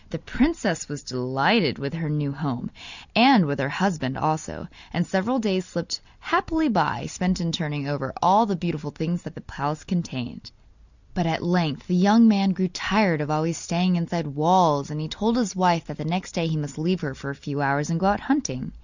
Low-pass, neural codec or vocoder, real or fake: 7.2 kHz; none; real